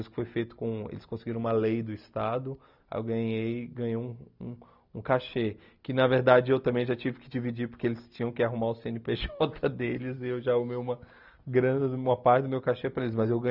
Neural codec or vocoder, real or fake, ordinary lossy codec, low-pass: none; real; none; 5.4 kHz